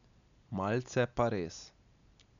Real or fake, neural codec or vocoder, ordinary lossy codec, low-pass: real; none; none; 7.2 kHz